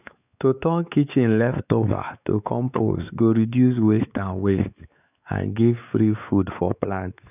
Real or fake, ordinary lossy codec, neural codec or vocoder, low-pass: fake; none; codec, 16 kHz, 4 kbps, X-Codec, WavLM features, trained on Multilingual LibriSpeech; 3.6 kHz